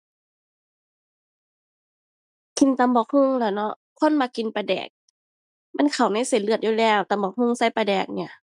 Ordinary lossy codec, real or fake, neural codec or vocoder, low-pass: none; real; none; 10.8 kHz